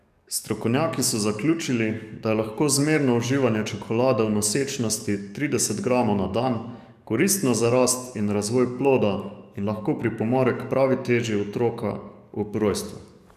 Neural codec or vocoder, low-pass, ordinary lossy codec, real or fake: codec, 44.1 kHz, 7.8 kbps, DAC; 14.4 kHz; none; fake